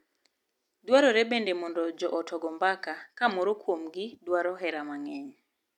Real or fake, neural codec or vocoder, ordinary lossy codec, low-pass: real; none; none; 19.8 kHz